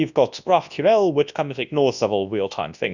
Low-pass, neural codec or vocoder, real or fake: 7.2 kHz; codec, 24 kHz, 0.9 kbps, WavTokenizer, large speech release; fake